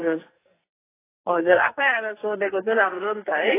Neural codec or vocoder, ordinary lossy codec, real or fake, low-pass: codec, 44.1 kHz, 2.6 kbps, SNAC; AAC, 16 kbps; fake; 3.6 kHz